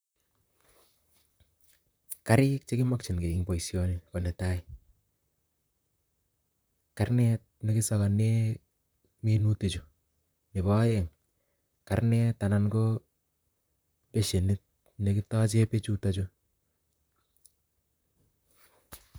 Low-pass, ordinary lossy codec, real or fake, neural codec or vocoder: none; none; fake; vocoder, 44.1 kHz, 128 mel bands, Pupu-Vocoder